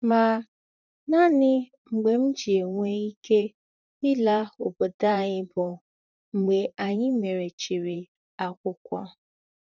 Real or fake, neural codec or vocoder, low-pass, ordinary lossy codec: fake; vocoder, 44.1 kHz, 128 mel bands, Pupu-Vocoder; 7.2 kHz; none